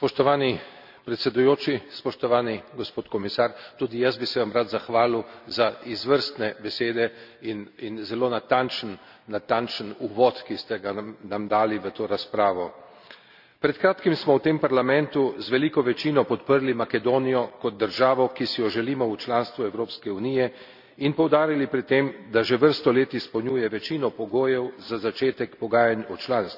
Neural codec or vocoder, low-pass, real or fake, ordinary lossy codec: none; 5.4 kHz; real; none